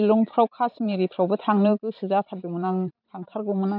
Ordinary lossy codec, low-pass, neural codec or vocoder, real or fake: none; 5.4 kHz; vocoder, 44.1 kHz, 128 mel bands every 512 samples, BigVGAN v2; fake